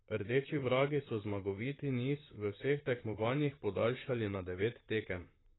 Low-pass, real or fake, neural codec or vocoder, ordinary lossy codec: 7.2 kHz; fake; vocoder, 44.1 kHz, 128 mel bands, Pupu-Vocoder; AAC, 16 kbps